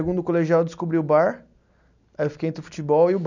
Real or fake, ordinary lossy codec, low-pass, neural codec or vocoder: real; none; 7.2 kHz; none